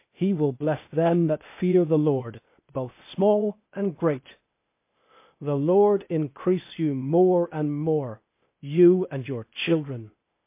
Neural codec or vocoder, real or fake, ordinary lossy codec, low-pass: codec, 16 kHz, 0.8 kbps, ZipCodec; fake; MP3, 24 kbps; 3.6 kHz